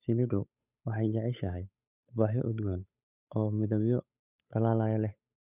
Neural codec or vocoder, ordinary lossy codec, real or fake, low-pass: codec, 16 kHz, 8 kbps, FunCodec, trained on Chinese and English, 25 frames a second; none; fake; 3.6 kHz